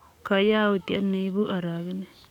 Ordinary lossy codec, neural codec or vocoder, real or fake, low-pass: none; codec, 44.1 kHz, 7.8 kbps, DAC; fake; 19.8 kHz